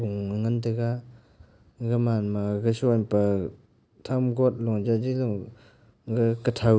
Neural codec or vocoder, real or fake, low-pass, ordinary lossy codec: none; real; none; none